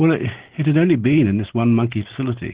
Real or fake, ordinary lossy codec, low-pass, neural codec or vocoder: real; Opus, 16 kbps; 3.6 kHz; none